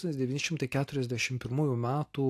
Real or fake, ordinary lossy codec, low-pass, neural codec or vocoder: real; AAC, 64 kbps; 14.4 kHz; none